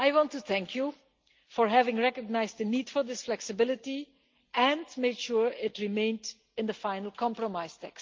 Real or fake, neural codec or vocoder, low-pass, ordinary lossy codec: real; none; 7.2 kHz; Opus, 32 kbps